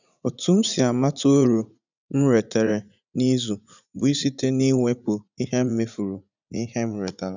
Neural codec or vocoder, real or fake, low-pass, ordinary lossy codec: vocoder, 44.1 kHz, 80 mel bands, Vocos; fake; 7.2 kHz; none